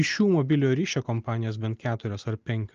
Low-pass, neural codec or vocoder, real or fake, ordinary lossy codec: 7.2 kHz; none; real; Opus, 24 kbps